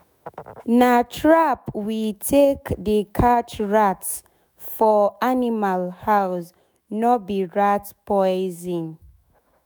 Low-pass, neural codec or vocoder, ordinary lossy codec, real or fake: none; autoencoder, 48 kHz, 128 numbers a frame, DAC-VAE, trained on Japanese speech; none; fake